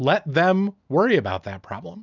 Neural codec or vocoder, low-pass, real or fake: none; 7.2 kHz; real